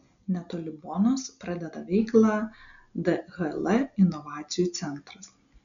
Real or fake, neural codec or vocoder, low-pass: real; none; 7.2 kHz